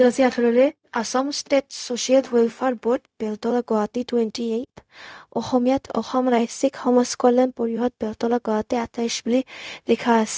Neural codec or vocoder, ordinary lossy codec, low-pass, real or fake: codec, 16 kHz, 0.4 kbps, LongCat-Audio-Codec; none; none; fake